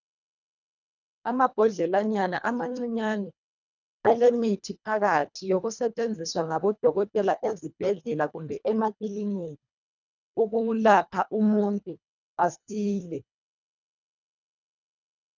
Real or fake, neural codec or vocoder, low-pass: fake; codec, 24 kHz, 1.5 kbps, HILCodec; 7.2 kHz